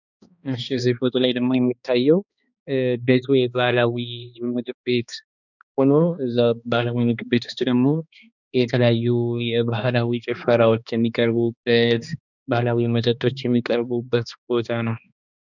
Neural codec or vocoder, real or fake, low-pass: codec, 16 kHz, 2 kbps, X-Codec, HuBERT features, trained on balanced general audio; fake; 7.2 kHz